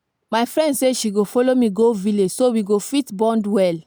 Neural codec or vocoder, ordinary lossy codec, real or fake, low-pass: none; none; real; none